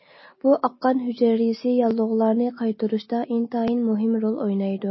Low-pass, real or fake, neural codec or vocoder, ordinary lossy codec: 7.2 kHz; real; none; MP3, 24 kbps